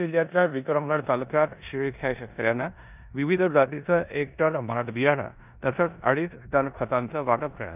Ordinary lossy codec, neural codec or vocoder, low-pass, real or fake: none; codec, 16 kHz in and 24 kHz out, 0.9 kbps, LongCat-Audio-Codec, four codebook decoder; 3.6 kHz; fake